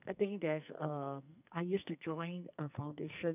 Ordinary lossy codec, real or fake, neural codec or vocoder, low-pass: none; fake; codec, 44.1 kHz, 2.6 kbps, SNAC; 3.6 kHz